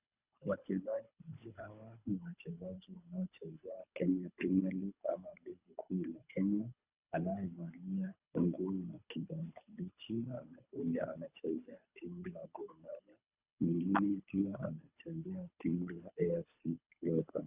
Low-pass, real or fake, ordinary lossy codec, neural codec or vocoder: 3.6 kHz; fake; Opus, 64 kbps; codec, 24 kHz, 3 kbps, HILCodec